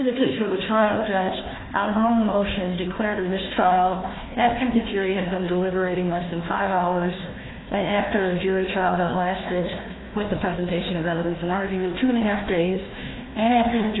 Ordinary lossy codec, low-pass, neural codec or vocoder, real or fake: AAC, 16 kbps; 7.2 kHz; codec, 16 kHz, 1 kbps, FunCodec, trained on Chinese and English, 50 frames a second; fake